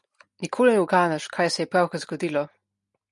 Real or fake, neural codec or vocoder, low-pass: real; none; 10.8 kHz